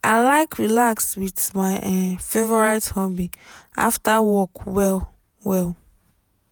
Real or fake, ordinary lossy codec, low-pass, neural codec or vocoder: fake; none; none; vocoder, 48 kHz, 128 mel bands, Vocos